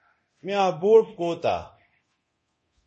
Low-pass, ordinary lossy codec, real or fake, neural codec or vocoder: 10.8 kHz; MP3, 32 kbps; fake; codec, 24 kHz, 0.9 kbps, DualCodec